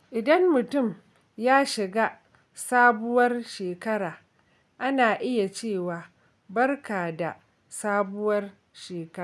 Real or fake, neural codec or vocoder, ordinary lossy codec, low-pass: real; none; none; none